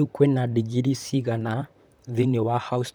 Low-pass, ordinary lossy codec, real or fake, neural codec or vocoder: none; none; fake; vocoder, 44.1 kHz, 128 mel bands, Pupu-Vocoder